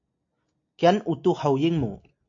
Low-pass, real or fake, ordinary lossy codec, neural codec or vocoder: 7.2 kHz; real; AAC, 48 kbps; none